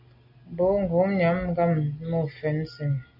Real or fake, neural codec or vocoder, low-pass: real; none; 5.4 kHz